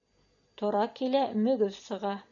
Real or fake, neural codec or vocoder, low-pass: real; none; 7.2 kHz